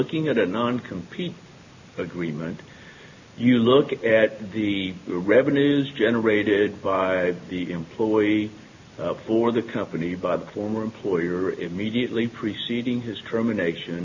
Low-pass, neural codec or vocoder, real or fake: 7.2 kHz; none; real